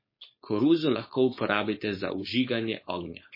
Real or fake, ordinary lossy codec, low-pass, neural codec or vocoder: fake; MP3, 24 kbps; 5.4 kHz; codec, 16 kHz, 4.8 kbps, FACodec